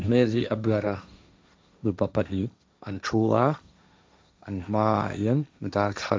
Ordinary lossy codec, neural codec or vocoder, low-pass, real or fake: none; codec, 16 kHz, 1.1 kbps, Voila-Tokenizer; none; fake